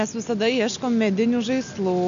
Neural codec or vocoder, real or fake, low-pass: none; real; 7.2 kHz